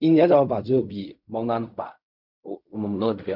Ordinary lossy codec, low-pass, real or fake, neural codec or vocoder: none; 5.4 kHz; fake; codec, 16 kHz in and 24 kHz out, 0.4 kbps, LongCat-Audio-Codec, fine tuned four codebook decoder